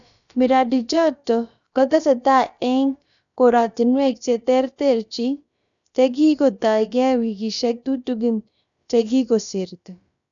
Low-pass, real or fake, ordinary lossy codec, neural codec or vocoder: 7.2 kHz; fake; none; codec, 16 kHz, about 1 kbps, DyCAST, with the encoder's durations